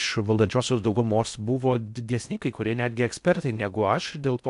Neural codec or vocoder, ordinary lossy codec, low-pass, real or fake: codec, 16 kHz in and 24 kHz out, 0.6 kbps, FocalCodec, streaming, 4096 codes; MP3, 96 kbps; 10.8 kHz; fake